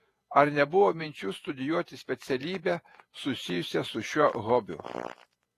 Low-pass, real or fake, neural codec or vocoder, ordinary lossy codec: 14.4 kHz; fake; vocoder, 48 kHz, 128 mel bands, Vocos; AAC, 48 kbps